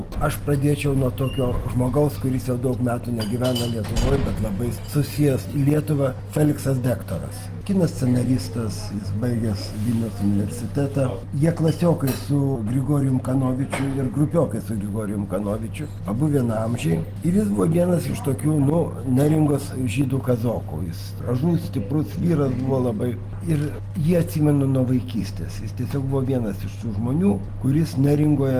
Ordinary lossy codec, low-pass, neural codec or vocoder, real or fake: Opus, 24 kbps; 14.4 kHz; none; real